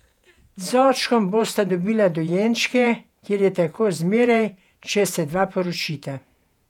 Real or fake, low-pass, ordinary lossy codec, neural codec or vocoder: fake; 19.8 kHz; none; vocoder, 48 kHz, 128 mel bands, Vocos